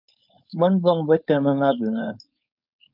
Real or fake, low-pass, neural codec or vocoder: fake; 5.4 kHz; codec, 16 kHz, 4.8 kbps, FACodec